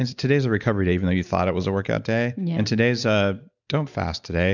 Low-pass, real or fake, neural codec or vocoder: 7.2 kHz; real; none